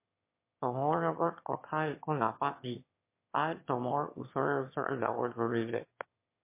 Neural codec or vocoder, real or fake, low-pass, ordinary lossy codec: autoencoder, 22.05 kHz, a latent of 192 numbers a frame, VITS, trained on one speaker; fake; 3.6 kHz; MP3, 24 kbps